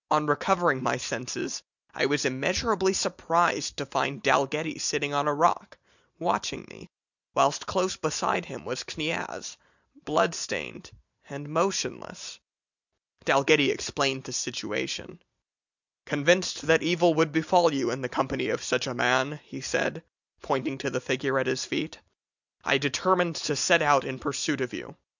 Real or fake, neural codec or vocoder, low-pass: real; none; 7.2 kHz